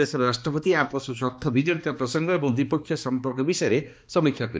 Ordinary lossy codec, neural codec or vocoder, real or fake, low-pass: none; codec, 16 kHz, 2 kbps, X-Codec, HuBERT features, trained on balanced general audio; fake; none